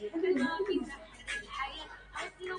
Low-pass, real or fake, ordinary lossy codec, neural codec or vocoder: 9.9 kHz; real; MP3, 96 kbps; none